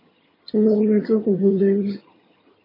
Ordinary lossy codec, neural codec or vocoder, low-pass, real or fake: MP3, 24 kbps; vocoder, 22.05 kHz, 80 mel bands, HiFi-GAN; 5.4 kHz; fake